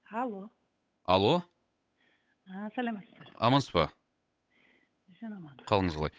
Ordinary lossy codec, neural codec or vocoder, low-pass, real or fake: none; codec, 16 kHz, 8 kbps, FunCodec, trained on Chinese and English, 25 frames a second; none; fake